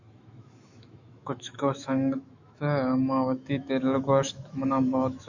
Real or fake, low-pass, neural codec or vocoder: fake; 7.2 kHz; autoencoder, 48 kHz, 128 numbers a frame, DAC-VAE, trained on Japanese speech